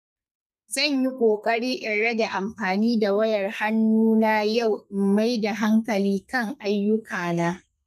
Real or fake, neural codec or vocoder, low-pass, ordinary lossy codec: fake; codec, 32 kHz, 1.9 kbps, SNAC; 14.4 kHz; none